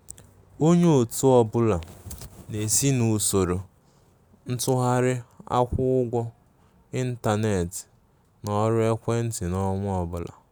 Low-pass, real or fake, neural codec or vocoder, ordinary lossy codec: none; real; none; none